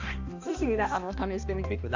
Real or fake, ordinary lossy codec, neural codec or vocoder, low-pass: fake; AAC, 48 kbps; codec, 16 kHz, 1 kbps, X-Codec, HuBERT features, trained on balanced general audio; 7.2 kHz